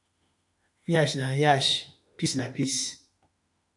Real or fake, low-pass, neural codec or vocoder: fake; 10.8 kHz; autoencoder, 48 kHz, 32 numbers a frame, DAC-VAE, trained on Japanese speech